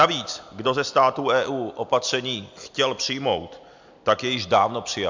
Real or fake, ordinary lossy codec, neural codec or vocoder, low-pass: real; MP3, 64 kbps; none; 7.2 kHz